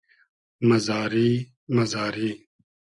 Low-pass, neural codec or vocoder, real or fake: 10.8 kHz; none; real